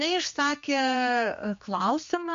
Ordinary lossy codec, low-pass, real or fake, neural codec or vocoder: MP3, 48 kbps; 7.2 kHz; fake; codec, 16 kHz, 2 kbps, X-Codec, HuBERT features, trained on general audio